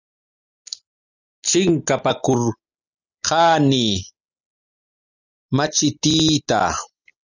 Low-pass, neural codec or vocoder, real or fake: 7.2 kHz; none; real